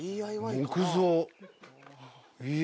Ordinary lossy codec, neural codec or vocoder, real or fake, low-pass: none; none; real; none